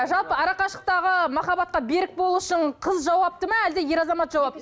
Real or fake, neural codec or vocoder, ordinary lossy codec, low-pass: real; none; none; none